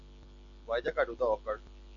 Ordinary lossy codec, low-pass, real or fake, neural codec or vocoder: MP3, 48 kbps; 7.2 kHz; real; none